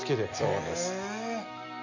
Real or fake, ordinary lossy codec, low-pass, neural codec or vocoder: real; none; 7.2 kHz; none